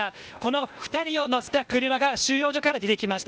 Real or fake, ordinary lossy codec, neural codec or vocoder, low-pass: fake; none; codec, 16 kHz, 0.8 kbps, ZipCodec; none